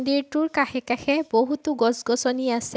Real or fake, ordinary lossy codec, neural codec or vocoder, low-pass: real; none; none; none